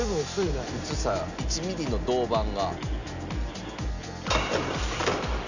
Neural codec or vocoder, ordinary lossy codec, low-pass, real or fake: none; none; 7.2 kHz; real